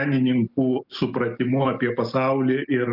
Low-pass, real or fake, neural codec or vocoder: 5.4 kHz; real; none